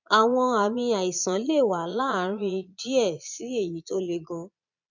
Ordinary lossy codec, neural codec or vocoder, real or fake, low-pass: none; vocoder, 22.05 kHz, 80 mel bands, Vocos; fake; 7.2 kHz